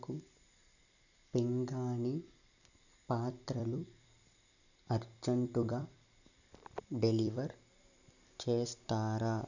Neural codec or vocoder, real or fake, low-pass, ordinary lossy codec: vocoder, 44.1 kHz, 128 mel bands every 256 samples, BigVGAN v2; fake; 7.2 kHz; none